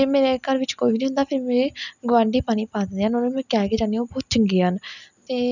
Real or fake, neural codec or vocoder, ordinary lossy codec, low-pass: real; none; none; 7.2 kHz